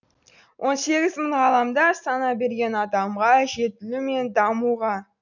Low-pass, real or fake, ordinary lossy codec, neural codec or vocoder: 7.2 kHz; real; none; none